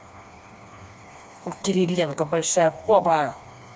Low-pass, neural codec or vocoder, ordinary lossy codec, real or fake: none; codec, 16 kHz, 2 kbps, FreqCodec, smaller model; none; fake